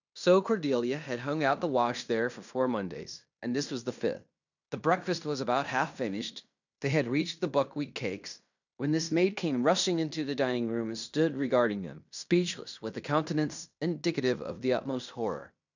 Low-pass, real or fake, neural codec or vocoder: 7.2 kHz; fake; codec, 16 kHz in and 24 kHz out, 0.9 kbps, LongCat-Audio-Codec, fine tuned four codebook decoder